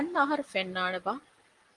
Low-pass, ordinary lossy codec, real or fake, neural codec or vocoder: 10.8 kHz; Opus, 24 kbps; real; none